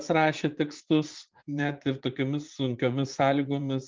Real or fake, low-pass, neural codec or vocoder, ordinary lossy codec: fake; 7.2 kHz; vocoder, 44.1 kHz, 80 mel bands, Vocos; Opus, 32 kbps